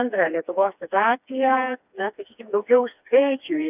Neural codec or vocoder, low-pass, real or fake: codec, 16 kHz, 2 kbps, FreqCodec, smaller model; 3.6 kHz; fake